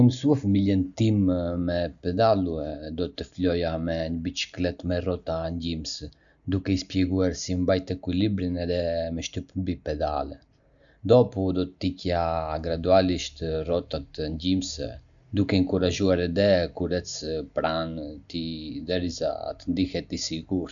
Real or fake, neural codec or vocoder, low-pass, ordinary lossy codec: real; none; 7.2 kHz; none